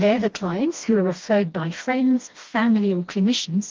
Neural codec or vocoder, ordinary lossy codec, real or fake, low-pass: codec, 16 kHz, 1 kbps, FreqCodec, smaller model; Opus, 32 kbps; fake; 7.2 kHz